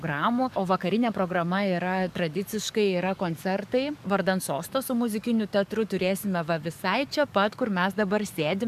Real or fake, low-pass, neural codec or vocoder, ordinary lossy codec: fake; 14.4 kHz; codec, 44.1 kHz, 7.8 kbps, DAC; MP3, 96 kbps